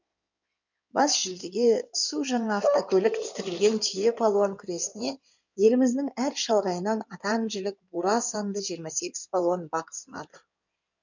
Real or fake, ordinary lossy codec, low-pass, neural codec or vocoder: fake; none; 7.2 kHz; codec, 16 kHz in and 24 kHz out, 2.2 kbps, FireRedTTS-2 codec